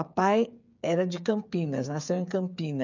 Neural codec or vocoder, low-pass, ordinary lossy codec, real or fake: codec, 16 kHz, 8 kbps, FreqCodec, smaller model; 7.2 kHz; none; fake